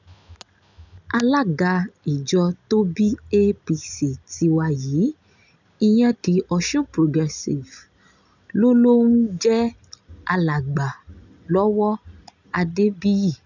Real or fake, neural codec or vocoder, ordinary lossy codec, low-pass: real; none; none; 7.2 kHz